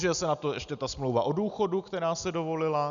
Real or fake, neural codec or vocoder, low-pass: real; none; 7.2 kHz